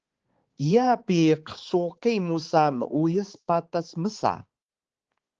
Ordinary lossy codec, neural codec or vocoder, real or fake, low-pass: Opus, 16 kbps; codec, 16 kHz, 4 kbps, X-Codec, HuBERT features, trained on balanced general audio; fake; 7.2 kHz